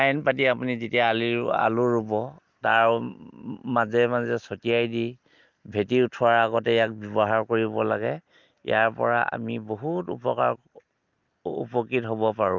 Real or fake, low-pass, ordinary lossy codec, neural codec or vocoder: real; 7.2 kHz; Opus, 32 kbps; none